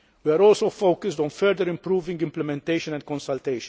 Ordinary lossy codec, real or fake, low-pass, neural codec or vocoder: none; real; none; none